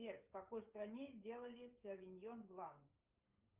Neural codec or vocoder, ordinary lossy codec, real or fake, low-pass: codec, 16 kHz, 16 kbps, FreqCodec, smaller model; Opus, 32 kbps; fake; 3.6 kHz